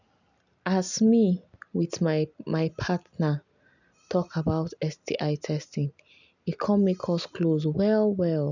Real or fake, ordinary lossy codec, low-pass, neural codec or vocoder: real; none; 7.2 kHz; none